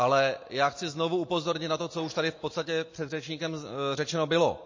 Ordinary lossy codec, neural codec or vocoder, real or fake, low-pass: MP3, 32 kbps; none; real; 7.2 kHz